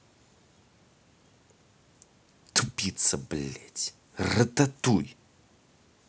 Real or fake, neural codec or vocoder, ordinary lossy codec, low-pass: real; none; none; none